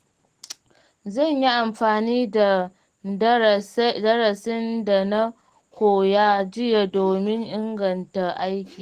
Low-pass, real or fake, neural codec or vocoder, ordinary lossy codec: 14.4 kHz; real; none; Opus, 16 kbps